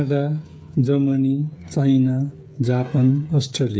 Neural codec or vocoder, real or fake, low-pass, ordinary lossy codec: codec, 16 kHz, 8 kbps, FreqCodec, smaller model; fake; none; none